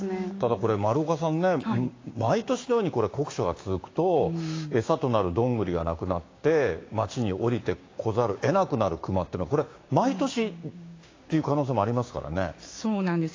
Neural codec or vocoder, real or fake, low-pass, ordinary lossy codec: codec, 16 kHz, 6 kbps, DAC; fake; 7.2 kHz; AAC, 32 kbps